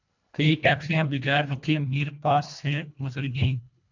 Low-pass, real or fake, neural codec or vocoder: 7.2 kHz; fake; codec, 24 kHz, 1.5 kbps, HILCodec